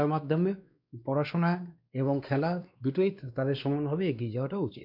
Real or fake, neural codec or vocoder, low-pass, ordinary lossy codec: fake; codec, 16 kHz, 2 kbps, X-Codec, WavLM features, trained on Multilingual LibriSpeech; 5.4 kHz; none